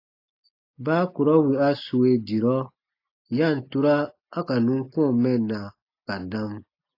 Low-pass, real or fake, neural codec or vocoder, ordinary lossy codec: 5.4 kHz; real; none; AAC, 32 kbps